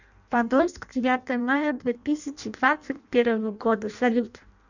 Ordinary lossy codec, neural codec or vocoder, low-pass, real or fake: none; codec, 16 kHz in and 24 kHz out, 0.6 kbps, FireRedTTS-2 codec; 7.2 kHz; fake